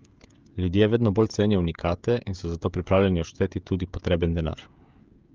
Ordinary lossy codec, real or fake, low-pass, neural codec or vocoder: Opus, 24 kbps; fake; 7.2 kHz; codec, 16 kHz, 16 kbps, FreqCodec, smaller model